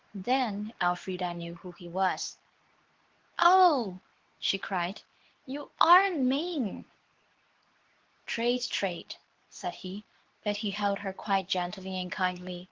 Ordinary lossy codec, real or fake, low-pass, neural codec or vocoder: Opus, 16 kbps; fake; 7.2 kHz; codec, 24 kHz, 0.9 kbps, WavTokenizer, medium speech release version 2